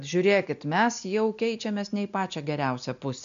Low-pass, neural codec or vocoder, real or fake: 7.2 kHz; none; real